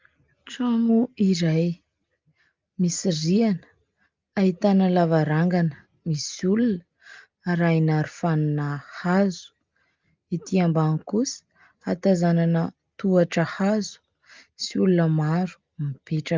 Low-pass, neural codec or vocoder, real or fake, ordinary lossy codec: 7.2 kHz; none; real; Opus, 24 kbps